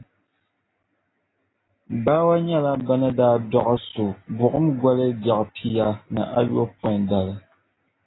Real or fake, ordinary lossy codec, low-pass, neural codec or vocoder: real; AAC, 16 kbps; 7.2 kHz; none